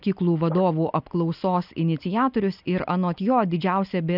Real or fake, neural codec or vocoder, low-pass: real; none; 5.4 kHz